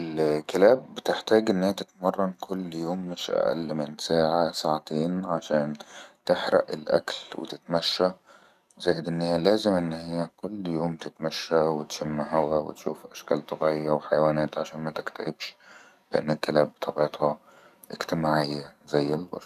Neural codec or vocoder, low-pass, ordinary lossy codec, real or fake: codec, 44.1 kHz, 7.8 kbps, DAC; 14.4 kHz; none; fake